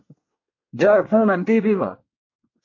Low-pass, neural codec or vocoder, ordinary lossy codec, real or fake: 7.2 kHz; codec, 24 kHz, 1 kbps, SNAC; MP3, 48 kbps; fake